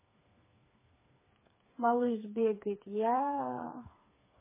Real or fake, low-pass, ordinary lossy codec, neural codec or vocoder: fake; 3.6 kHz; MP3, 16 kbps; codec, 16 kHz, 4 kbps, FreqCodec, smaller model